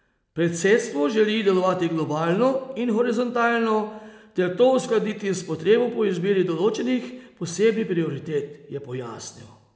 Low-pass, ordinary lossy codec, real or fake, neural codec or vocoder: none; none; real; none